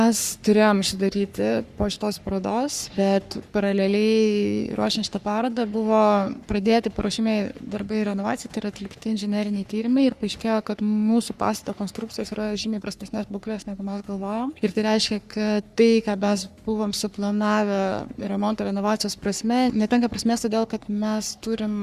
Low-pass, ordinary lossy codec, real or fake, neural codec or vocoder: 14.4 kHz; Opus, 64 kbps; fake; codec, 44.1 kHz, 3.4 kbps, Pupu-Codec